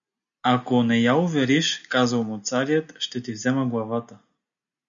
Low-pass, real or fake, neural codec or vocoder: 7.2 kHz; real; none